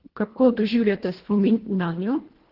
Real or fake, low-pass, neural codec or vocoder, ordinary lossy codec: fake; 5.4 kHz; codec, 24 kHz, 1.5 kbps, HILCodec; Opus, 16 kbps